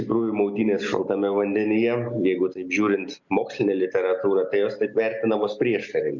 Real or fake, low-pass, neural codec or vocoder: real; 7.2 kHz; none